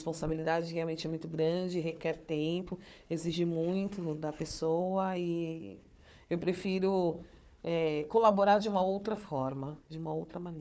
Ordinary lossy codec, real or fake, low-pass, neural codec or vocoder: none; fake; none; codec, 16 kHz, 4 kbps, FunCodec, trained on Chinese and English, 50 frames a second